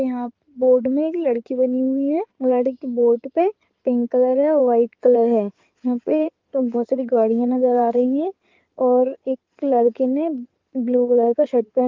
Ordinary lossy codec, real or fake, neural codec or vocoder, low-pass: Opus, 32 kbps; fake; codec, 16 kHz in and 24 kHz out, 2.2 kbps, FireRedTTS-2 codec; 7.2 kHz